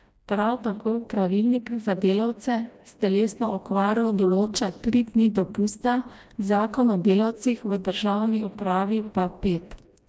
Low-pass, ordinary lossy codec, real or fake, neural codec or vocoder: none; none; fake; codec, 16 kHz, 1 kbps, FreqCodec, smaller model